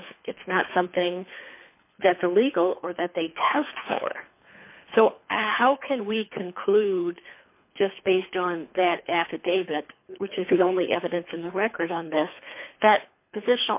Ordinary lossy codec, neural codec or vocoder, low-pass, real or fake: MP3, 32 kbps; codec, 24 kHz, 3 kbps, HILCodec; 3.6 kHz; fake